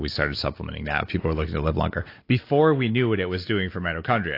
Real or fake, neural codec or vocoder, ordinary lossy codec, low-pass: real; none; AAC, 32 kbps; 5.4 kHz